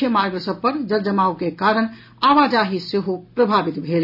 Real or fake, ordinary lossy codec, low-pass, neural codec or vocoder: real; none; 5.4 kHz; none